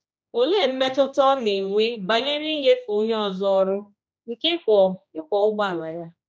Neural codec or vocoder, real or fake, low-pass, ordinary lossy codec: codec, 16 kHz, 1 kbps, X-Codec, HuBERT features, trained on general audio; fake; none; none